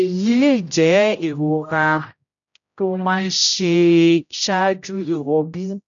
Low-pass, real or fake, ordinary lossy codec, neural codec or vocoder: 7.2 kHz; fake; none; codec, 16 kHz, 0.5 kbps, X-Codec, HuBERT features, trained on general audio